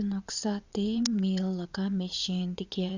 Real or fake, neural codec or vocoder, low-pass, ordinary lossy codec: fake; vocoder, 22.05 kHz, 80 mel bands, WaveNeXt; 7.2 kHz; Opus, 64 kbps